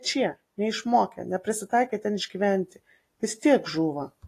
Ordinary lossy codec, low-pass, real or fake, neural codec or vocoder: AAC, 48 kbps; 14.4 kHz; real; none